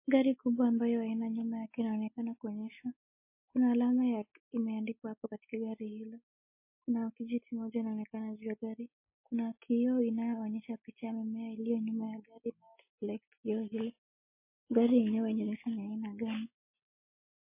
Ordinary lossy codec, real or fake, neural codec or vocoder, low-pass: MP3, 24 kbps; real; none; 3.6 kHz